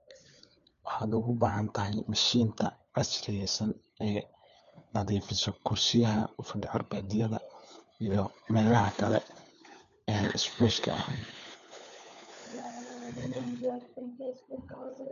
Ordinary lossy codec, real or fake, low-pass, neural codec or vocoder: none; fake; 7.2 kHz; codec, 16 kHz, 4 kbps, FunCodec, trained on LibriTTS, 50 frames a second